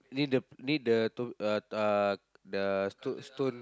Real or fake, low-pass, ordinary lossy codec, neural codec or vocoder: real; none; none; none